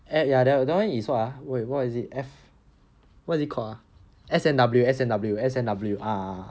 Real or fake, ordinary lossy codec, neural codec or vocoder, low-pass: real; none; none; none